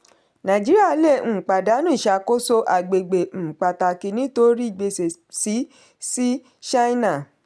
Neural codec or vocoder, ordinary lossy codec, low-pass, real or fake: none; none; none; real